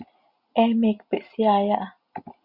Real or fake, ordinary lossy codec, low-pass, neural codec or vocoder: real; Opus, 64 kbps; 5.4 kHz; none